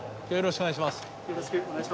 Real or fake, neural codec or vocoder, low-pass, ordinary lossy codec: real; none; none; none